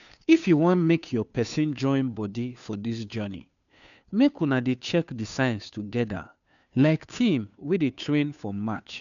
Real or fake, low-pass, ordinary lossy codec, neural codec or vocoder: fake; 7.2 kHz; none; codec, 16 kHz, 2 kbps, FunCodec, trained on Chinese and English, 25 frames a second